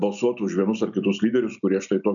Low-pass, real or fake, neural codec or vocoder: 7.2 kHz; real; none